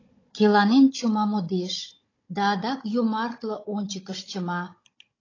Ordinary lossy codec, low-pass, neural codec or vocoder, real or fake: AAC, 32 kbps; 7.2 kHz; codec, 16 kHz, 16 kbps, FunCodec, trained on Chinese and English, 50 frames a second; fake